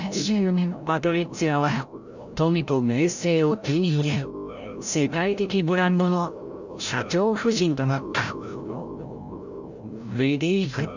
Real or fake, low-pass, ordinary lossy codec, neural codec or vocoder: fake; 7.2 kHz; none; codec, 16 kHz, 0.5 kbps, FreqCodec, larger model